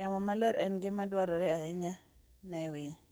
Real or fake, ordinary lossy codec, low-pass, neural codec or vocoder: fake; none; none; codec, 44.1 kHz, 2.6 kbps, SNAC